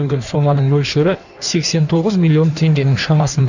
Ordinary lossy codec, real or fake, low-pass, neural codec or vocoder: none; fake; 7.2 kHz; codec, 16 kHz in and 24 kHz out, 1.1 kbps, FireRedTTS-2 codec